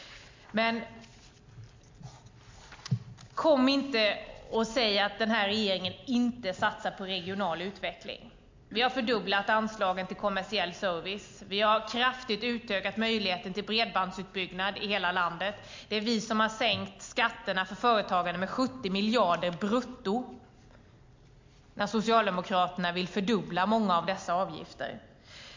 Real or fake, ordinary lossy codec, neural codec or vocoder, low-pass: real; MP3, 48 kbps; none; 7.2 kHz